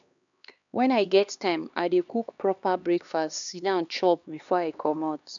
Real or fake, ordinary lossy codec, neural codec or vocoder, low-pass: fake; none; codec, 16 kHz, 2 kbps, X-Codec, HuBERT features, trained on LibriSpeech; 7.2 kHz